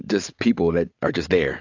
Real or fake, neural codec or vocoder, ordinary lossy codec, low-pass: real; none; AAC, 48 kbps; 7.2 kHz